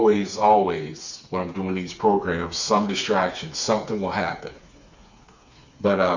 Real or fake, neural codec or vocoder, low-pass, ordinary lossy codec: fake; codec, 16 kHz, 4 kbps, FreqCodec, smaller model; 7.2 kHz; Opus, 64 kbps